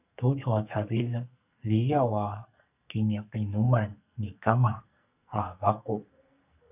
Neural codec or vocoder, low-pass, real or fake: codec, 44.1 kHz, 2.6 kbps, SNAC; 3.6 kHz; fake